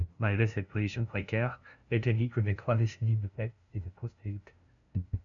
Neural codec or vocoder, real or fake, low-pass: codec, 16 kHz, 0.5 kbps, FunCodec, trained on LibriTTS, 25 frames a second; fake; 7.2 kHz